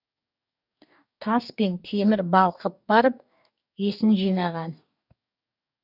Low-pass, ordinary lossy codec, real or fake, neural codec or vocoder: 5.4 kHz; none; fake; codec, 44.1 kHz, 2.6 kbps, DAC